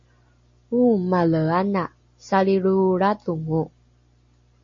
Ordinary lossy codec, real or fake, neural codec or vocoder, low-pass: MP3, 32 kbps; real; none; 7.2 kHz